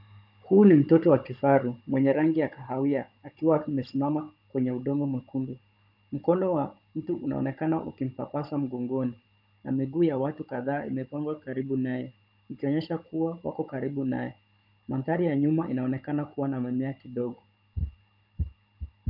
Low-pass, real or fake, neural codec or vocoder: 5.4 kHz; fake; codec, 16 kHz, 16 kbps, FunCodec, trained on Chinese and English, 50 frames a second